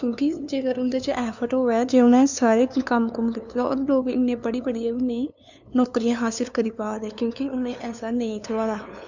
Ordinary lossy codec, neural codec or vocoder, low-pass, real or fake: none; codec, 16 kHz, 2 kbps, FunCodec, trained on LibriTTS, 25 frames a second; 7.2 kHz; fake